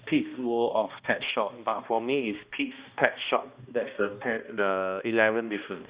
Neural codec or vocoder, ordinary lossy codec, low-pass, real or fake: codec, 16 kHz, 1 kbps, X-Codec, HuBERT features, trained on balanced general audio; Opus, 64 kbps; 3.6 kHz; fake